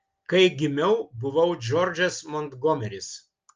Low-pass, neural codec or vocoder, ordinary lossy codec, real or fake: 7.2 kHz; none; Opus, 24 kbps; real